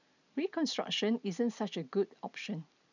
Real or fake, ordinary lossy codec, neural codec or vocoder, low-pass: real; none; none; 7.2 kHz